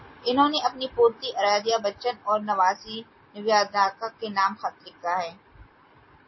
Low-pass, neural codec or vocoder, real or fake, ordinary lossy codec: 7.2 kHz; none; real; MP3, 24 kbps